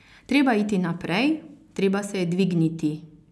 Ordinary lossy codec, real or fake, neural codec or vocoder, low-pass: none; real; none; none